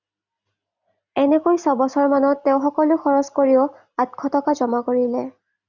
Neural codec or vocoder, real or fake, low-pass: none; real; 7.2 kHz